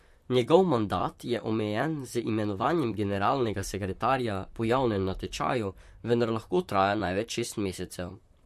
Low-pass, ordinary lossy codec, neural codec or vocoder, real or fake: 14.4 kHz; MP3, 64 kbps; vocoder, 44.1 kHz, 128 mel bands, Pupu-Vocoder; fake